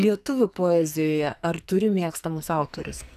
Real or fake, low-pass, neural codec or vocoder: fake; 14.4 kHz; codec, 44.1 kHz, 2.6 kbps, SNAC